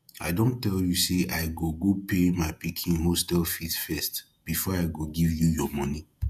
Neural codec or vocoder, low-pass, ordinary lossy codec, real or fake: vocoder, 48 kHz, 128 mel bands, Vocos; 14.4 kHz; none; fake